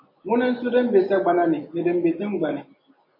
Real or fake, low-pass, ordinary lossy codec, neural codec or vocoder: real; 5.4 kHz; MP3, 32 kbps; none